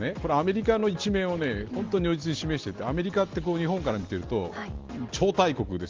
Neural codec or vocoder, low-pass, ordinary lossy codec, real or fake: none; 7.2 kHz; Opus, 16 kbps; real